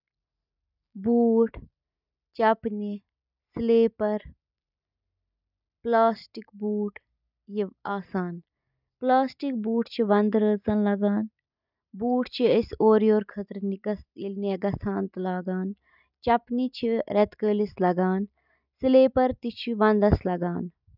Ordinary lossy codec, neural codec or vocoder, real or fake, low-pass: none; none; real; 5.4 kHz